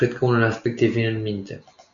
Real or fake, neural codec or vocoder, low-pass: real; none; 7.2 kHz